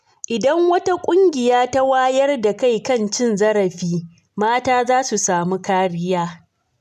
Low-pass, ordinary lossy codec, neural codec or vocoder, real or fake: 14.4 kHz; none; none; real